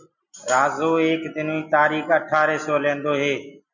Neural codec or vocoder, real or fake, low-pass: none; real; 7.2 kHz